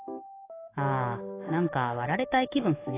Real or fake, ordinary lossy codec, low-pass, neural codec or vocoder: fake; AAC, 16 kbps; 3.6 kHz; codec, 44.1 kHz, 7.8 kbps, DAC